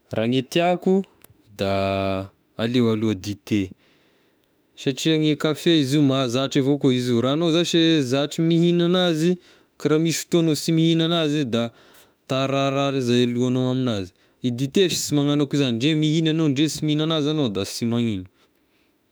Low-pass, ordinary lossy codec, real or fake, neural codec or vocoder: none; none; fake; autoencoder, 48 kHz, 32 numbers a frame, DAC-VAE, trained on Japanese speech